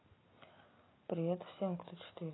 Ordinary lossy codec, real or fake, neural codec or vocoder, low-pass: AAC, 16 kbps; real; none; 7.2 kHz